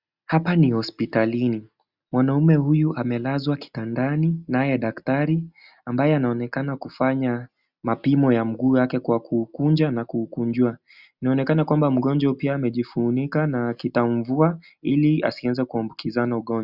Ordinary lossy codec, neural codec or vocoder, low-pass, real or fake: Opus, 64 kbps; none; 5.4 kHz; real